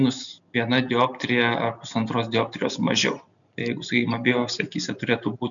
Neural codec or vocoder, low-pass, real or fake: none; 7.2 kHz; real